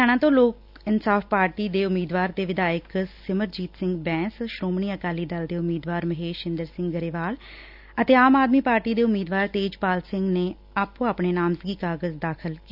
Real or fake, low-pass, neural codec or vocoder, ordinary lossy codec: real; 5.4 kHz; none; none